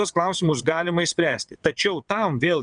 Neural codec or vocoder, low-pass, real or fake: vocoder, 22.05 kHz, 80 mel bands, WaveNeXt; 9.9 kHz; fake